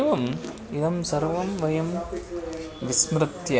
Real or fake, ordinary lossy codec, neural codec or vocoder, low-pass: real; none; none; none